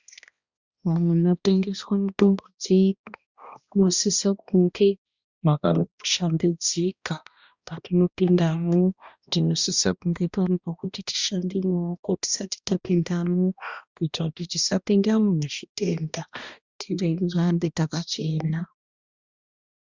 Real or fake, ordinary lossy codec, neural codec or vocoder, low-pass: fake; Opus, 64 kbps; codec, 16 kHz, 1 kbps, X-Codec, HuBERT features, trained on balanced general audio; 7.2 kHz